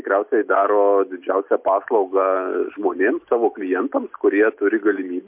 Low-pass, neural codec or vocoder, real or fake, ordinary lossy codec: 3.6 kHz; none; real; MP3, 32 kbps